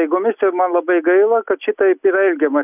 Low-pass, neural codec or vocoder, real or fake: 3.6 kHz; none; real